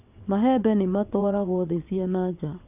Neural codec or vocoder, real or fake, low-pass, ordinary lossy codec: vocoder, 22.05 kHz, 80 mel bands, WaveNeXt; fake; 3.6 kHz; none